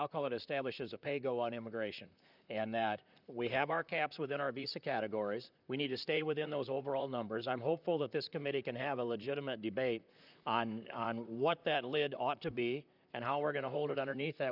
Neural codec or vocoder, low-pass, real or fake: vocoder, 44.1 kHz, 128 mel bands, Pupu-Vocoder; 5.4 kHz; fake